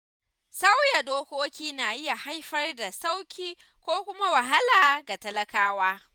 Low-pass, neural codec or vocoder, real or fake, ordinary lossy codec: none; vocoder, 48 kHz, 128 mel bands, Vocos; fake; none